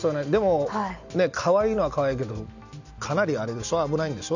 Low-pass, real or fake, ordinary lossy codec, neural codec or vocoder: 7.2 kHz; real; none; none